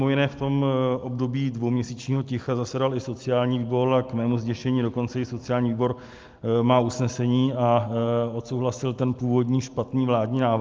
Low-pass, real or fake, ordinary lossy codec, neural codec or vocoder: 7.2 kHz; real; Opus, 32 kbps; none